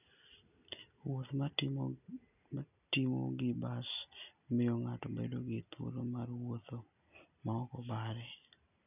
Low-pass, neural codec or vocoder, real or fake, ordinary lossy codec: 3.6 kHz; none; real; none